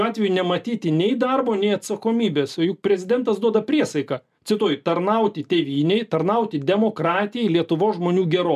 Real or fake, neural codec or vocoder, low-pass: real; none; 14.4 kHz